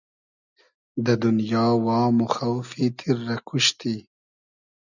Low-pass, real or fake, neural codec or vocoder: 7.2 kHz; real; none